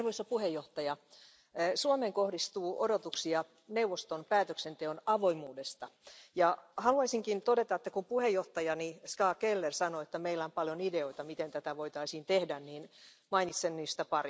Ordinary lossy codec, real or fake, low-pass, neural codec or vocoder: none; real; none; none